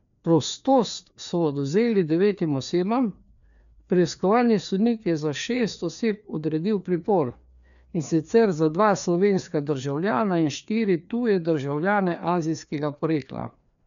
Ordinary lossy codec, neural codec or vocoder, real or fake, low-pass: none; codec, 16 kHz, 2 kbps, FreqCodec, larger model; fake; 7.2 kHz